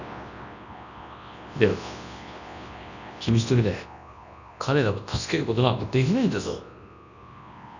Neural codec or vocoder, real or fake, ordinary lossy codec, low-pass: codec, 24 kHz, 0.9 kbps, WavTokenizer, large speech release; fake; none; 7.2 kHz